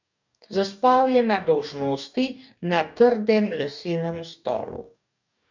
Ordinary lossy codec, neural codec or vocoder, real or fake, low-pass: none; codec, 44.1 kHz, 2.6 kbps, DAC; fake; 7.2 kHz